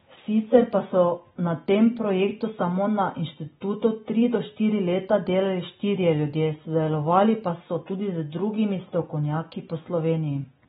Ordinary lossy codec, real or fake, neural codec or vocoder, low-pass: AAC, 16 kbps; real; none; 19.8 kHz